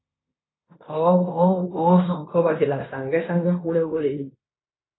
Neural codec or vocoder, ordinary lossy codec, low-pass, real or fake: codec, 16 kHz in and 24 kHz out, 0.9 kbps, LongCat-Audio-Codec, fine tuned four codebook decoder; AAC, 16 kbps; 7.2 kHz; fake